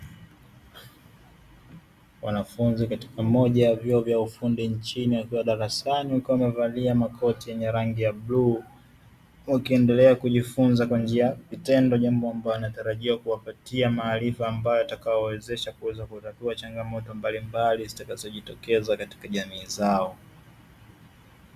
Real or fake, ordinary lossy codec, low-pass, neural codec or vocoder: real; Opus, 64 kbps; 14.4 kHz; none